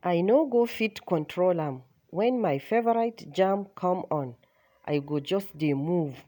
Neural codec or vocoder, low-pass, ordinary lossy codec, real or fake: none; none; none; real